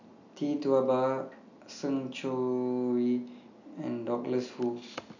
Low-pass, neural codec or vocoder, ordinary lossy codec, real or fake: 7.2 kHz; none; none; real